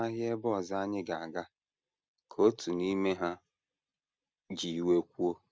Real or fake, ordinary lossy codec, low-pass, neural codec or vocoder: real; none; none; none